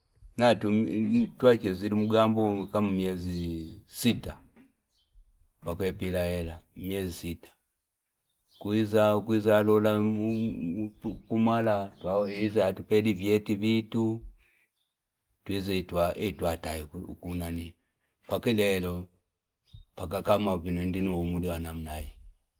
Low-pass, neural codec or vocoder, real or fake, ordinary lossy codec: 19.8 kHz; none; real; Opus, 32 kbps